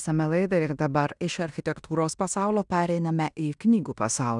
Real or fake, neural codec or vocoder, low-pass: fake; codec, 16 kHz in and 24 kHz out, 0.9 kbps, LongCat-Audio-Codec, fine tuned four codebook decoder; 10.8 kHz